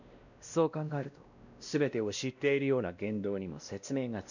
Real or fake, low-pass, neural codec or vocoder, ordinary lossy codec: fake; 7.2 kHz; codec, 16 kHz, 1 kbps, X-Codec, WavLM features, trained on Multilingual LibriSpeech; none